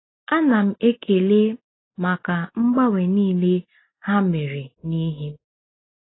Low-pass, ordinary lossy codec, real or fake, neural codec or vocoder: 7.2 kHz; AAC, 16 kbps; real; none